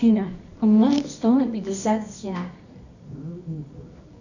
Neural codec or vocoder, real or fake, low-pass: codec, 24 kHz, 0.9 kbps, WavTokenizer, medium music audio release; fake; 7.2 kHz